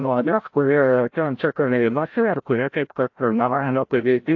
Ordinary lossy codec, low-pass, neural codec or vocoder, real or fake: AAC, 48 kbps; 7.2 kHz; codec, 16 kHz, 0.5 kbps, FreqCodec, larger model; fake